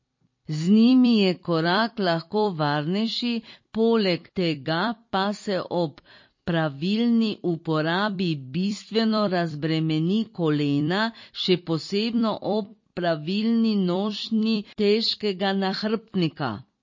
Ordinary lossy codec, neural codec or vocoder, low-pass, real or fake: MP3, 32 kbps; vocoder, 44.1 kHz, 128 mel bands every 256 samples, BigVGAN v2; 7.2 kHz; fake